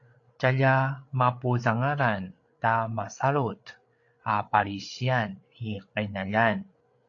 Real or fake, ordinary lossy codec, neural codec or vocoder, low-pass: fake; AAC, 48 kbps; codec, 16 kHz, 8 kbps, FreqCodec, larger model; 7.2 kHz